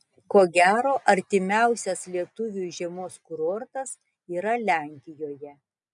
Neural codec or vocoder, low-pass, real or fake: none; 10.8 kHz; real